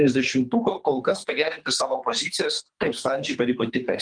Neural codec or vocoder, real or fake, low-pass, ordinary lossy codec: codec, 24 kHz, 3 kbps, HILCodec; fake; 9.9 kHz; Opus, 32 kbps